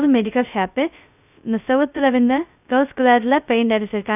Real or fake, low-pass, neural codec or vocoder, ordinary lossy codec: fake; 3.6 kHz; codec, 16 kHz, 0.2 kbps, FocalCodec; none